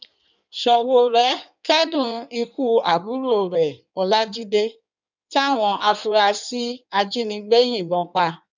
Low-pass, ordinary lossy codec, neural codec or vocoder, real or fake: 7.2 kHz; none; codec, 16 kHz in and 24 kHz out, 1.1 kbps, FireRedTTS-2 codec; fake